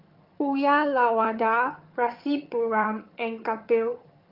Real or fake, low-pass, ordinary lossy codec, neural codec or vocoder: fake; 5.4 kHz; Opus, 24 kbps; vocoder, 22.05 kHz, 80 mel bands, HiFi-GAN